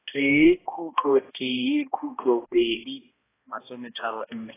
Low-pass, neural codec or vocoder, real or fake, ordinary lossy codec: 3.6 kHz; codec, 16 kHz, 1 kbps, X-Codec, HuBERT features, trained on balanced general audio; fake; AAC, 16 kbps